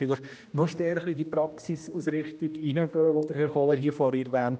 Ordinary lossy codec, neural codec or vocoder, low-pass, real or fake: none; codec, 16 kHz, 1 kbps, X-Codec, HuBERT features, trained on general audio; none; fake